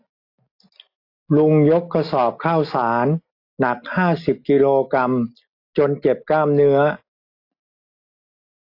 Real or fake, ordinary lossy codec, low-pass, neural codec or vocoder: real; AAC, 32 kbps; 5.4 kHz; none